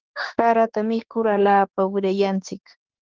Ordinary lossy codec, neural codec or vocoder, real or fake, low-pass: Opus, 16 kbps; autoencoder, 48 kHz, 32 numbers a frame, DAC-VAE, trained on Japanese speech; fake; 7.2 kHz